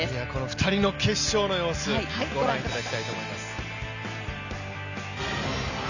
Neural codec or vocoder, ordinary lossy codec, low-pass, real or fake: none; none; 7.2 kHz; real